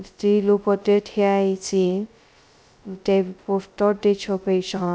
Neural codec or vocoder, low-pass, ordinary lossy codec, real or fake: codec, 16 kHz, 0.2 kbps, FocalCodec; none; none; fake